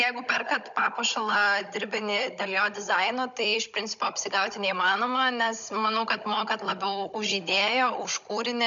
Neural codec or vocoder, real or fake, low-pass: codec, 16 kHz, 16 kbps, FunCodec, trained on Chinese and English, 50 frames a second; fake; 7.2 kHz